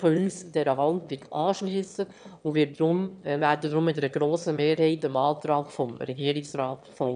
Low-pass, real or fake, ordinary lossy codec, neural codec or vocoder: 9.9 kHz; fake; none; autoencoder, 22.05 kHz, a latent of 192 numbers a frame, VITS, trained on one speaker